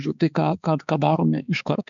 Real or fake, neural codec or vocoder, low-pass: fake; codec, 16 kHz, 4 kbps, X-Codec, HuBERT features, trained on balanced general audio; 7.2 kHz